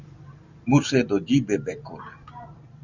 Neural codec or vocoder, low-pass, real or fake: vocoder, 44.1 kHz, 128 mel bands every 256 samples, BigVGAN v2; 7.2 kHz; fake